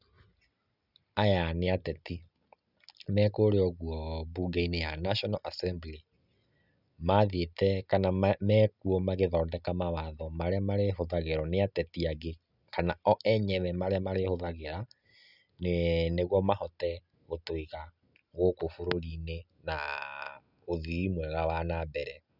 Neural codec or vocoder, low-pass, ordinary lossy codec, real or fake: none; 5.4 kHz; none; real